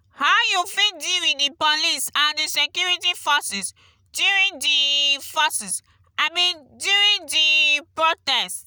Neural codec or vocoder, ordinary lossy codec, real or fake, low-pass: none; none; real; none